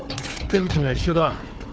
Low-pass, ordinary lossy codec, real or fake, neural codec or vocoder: none; none; fake; codec, 16 kHz, 4 kbps, FunCodec, trained on Chinese and English, 50 frames a second